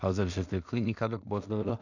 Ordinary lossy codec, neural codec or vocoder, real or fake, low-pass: none; codec, 16 kHz in and 24 kHz out, 0.4 kbps, LongCat-Audio-Codec, two codebook decoder; fake; 7.2 kHz